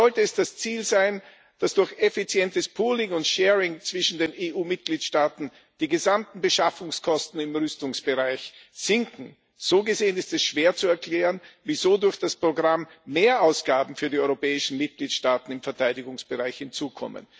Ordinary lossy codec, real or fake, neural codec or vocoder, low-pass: none; real; none; none